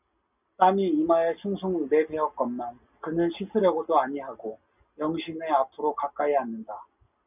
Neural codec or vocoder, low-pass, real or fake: none; 3.6 kHz; real